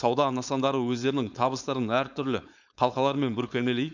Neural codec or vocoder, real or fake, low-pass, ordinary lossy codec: codec, 16 kHz, 4.8 kbps, FACodec; fake; 7.2 kHz; none